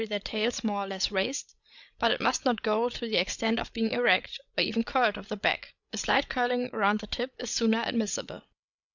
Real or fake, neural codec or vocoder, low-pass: fake; codec, 16 kHz, 8 kbps, FreqCodec, larger model; 7.2 kHz